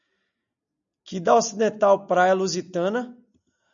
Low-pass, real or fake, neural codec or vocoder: 7.2 kHz; real; none